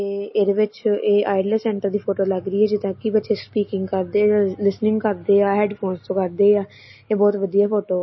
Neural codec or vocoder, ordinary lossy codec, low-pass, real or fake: none; MP3, 24 kbps; 7.2 kHz; real